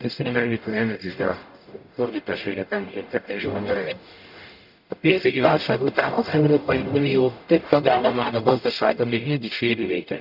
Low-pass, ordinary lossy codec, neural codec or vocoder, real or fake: 5.4 kHz; none; codec, 44.1 kHz, 0.9 kbps, DAC; fake